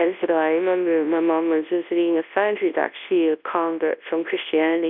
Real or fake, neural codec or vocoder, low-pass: fake; codec, 24 kHz, 0.9 kbps, WavTokenizer, large speech release; 5.4 kHz